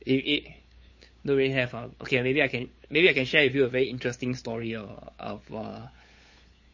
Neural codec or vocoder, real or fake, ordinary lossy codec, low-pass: codec, 16 kHz, 8 kbps, FunCodec, trained on LibriTTS, 25 frames a second; fake; MP3, 32 kbps; 7.2 kHz